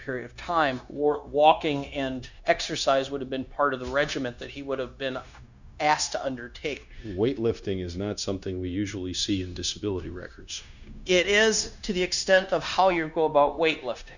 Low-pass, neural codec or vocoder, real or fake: 7.2 kHz; codec, 16 kHz, 0.9 kbps, LongCat-Audio-Codec; fake